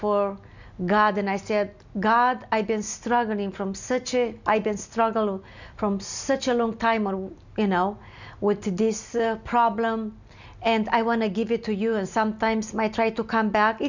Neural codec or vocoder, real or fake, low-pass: none; real; 7.2 kHz